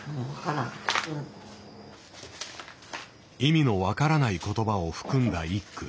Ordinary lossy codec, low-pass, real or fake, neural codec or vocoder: none; none; real; none